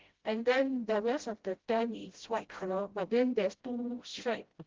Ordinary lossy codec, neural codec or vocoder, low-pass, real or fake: Opus, 16 kbps; codec, 16 kHz, 0.5 kbps, FreqCodec, smaller model; 7.2 kHz; fake